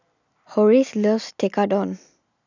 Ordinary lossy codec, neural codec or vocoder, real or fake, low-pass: none; none; real; 7.2 kHz